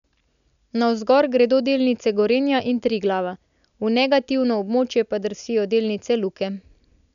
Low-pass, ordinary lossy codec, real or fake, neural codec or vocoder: 7.2 kHz; none; real; none